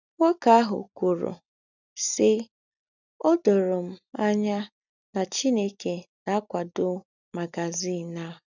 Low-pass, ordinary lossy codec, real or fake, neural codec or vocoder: 7.2 kHz; none; real; none